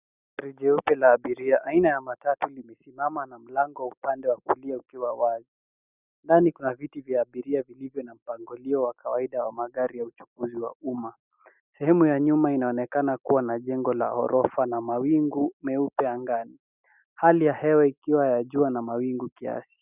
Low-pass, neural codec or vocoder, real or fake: 3.6 kHz; none; real